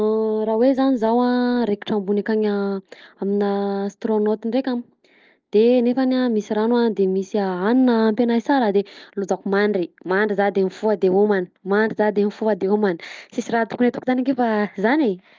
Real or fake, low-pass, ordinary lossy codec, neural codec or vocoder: real; 7.2 kHz; Opus, 24 kbps; none